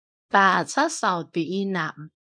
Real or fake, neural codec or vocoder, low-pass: fake; codec, 24 kHz, 0.9 kbps, WavTokenizer, small release; 9.9 kHz